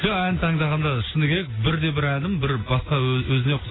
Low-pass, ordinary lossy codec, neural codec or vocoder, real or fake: 7.2 kHz; AAC, 16 kbps; none; real